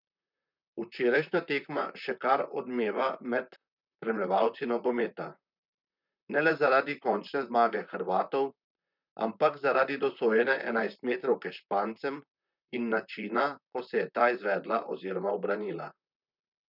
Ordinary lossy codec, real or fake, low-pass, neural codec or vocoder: none; fake; 5.4 kHz; vocoder, 44.1 kHz, 128 mel bands, Pupu-Vocoder